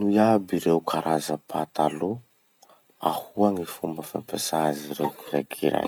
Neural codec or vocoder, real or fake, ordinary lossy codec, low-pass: none; real; none; none